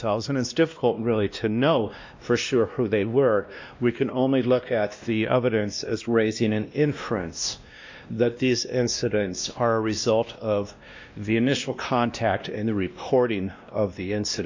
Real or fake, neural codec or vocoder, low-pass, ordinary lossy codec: fake; codec, 16 kHz, 1 kbps, X-Codec, WavLM features, trained on Multilingual LibriSpeech; 7.2 kHz; MP3, 64 kbps